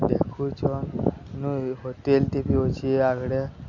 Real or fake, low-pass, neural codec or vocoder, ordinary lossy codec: real; 7.2 kHz; none; none